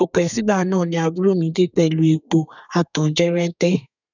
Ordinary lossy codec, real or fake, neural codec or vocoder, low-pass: none; fake; codec, 32 kHz, 1.9 kbps, SNAC; 7.2 kHz